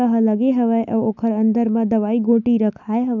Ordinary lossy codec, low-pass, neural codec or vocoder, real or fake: none; 7.2 kHz; none; real